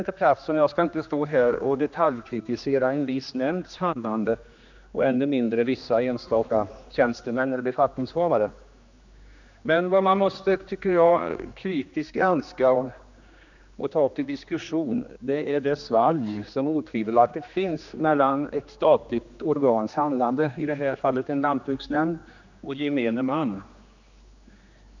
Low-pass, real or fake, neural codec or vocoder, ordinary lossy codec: 7.2 kHz; fake; codec, 16 kHz, 2 kbps, X-Codec, HuBERT features, trained on general audio; none